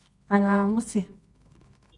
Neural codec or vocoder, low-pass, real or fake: codec, 24 kHz, 0.9 kbps, WavTokenizer, medium music audio release; 10.8 kHz; fake